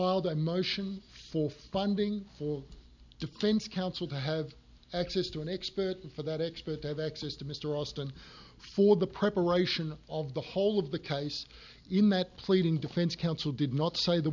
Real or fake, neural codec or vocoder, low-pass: real; none; 7.2 kHz